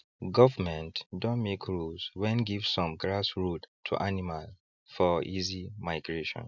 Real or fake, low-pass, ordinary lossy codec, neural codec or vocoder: real; 7.2 kHz; none; none